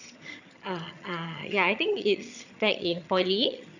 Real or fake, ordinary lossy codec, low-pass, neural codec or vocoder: fake; none; 7.2 kHz; vocoder, 22.05 kHz, 80 mel bands, HiFi-GAN